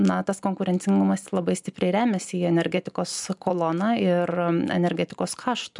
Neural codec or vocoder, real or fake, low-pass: none; real; 10.8 kHz